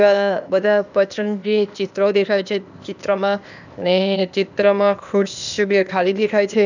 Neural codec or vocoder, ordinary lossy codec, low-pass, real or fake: codec, 16 kHz, 0.8 kbps, ZipCodec; none; 7.2 kHz; fake